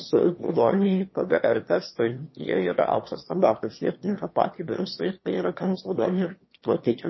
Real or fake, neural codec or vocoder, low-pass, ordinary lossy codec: fake; autoencoder, 22.05 kHz, a latent of 192 numbers a frame, VITS, trained on one speaker; 7.2 kHz; MP3, 24 kbps